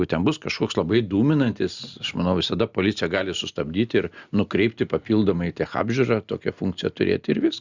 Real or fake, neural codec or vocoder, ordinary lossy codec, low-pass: real; none; Opus, 64 kbps; 7.2 kHz